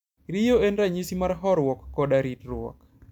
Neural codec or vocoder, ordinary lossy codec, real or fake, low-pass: none; none; real; 19.8 kHz